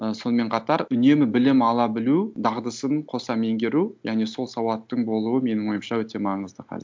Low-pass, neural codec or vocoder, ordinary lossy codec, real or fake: none; none; none; real